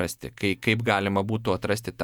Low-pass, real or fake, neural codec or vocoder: 19.8 kHz; fake; vocoder, 48 kHz, 128 mel bands, Vocos